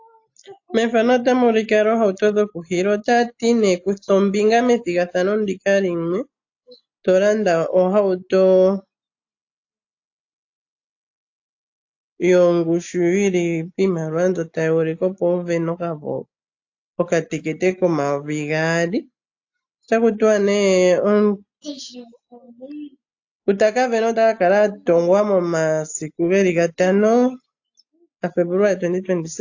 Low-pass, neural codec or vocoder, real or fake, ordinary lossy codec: 7.2 kHz; none; real; AAC, 48 kbps